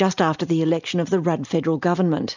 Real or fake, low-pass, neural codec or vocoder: real; 7.2 kHz; none